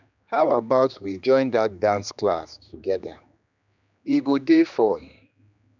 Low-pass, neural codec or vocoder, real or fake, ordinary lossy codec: 7.2 kHz; codec, 16 kHz, 2 kbps, X-Codec, HuBERT features, trained on general audio; fake; none